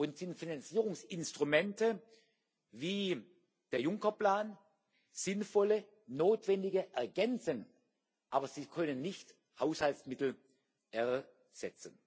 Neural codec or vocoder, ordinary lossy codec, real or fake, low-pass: none; none; real; none